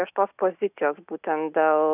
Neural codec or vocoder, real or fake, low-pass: none; real; 3.6 kHz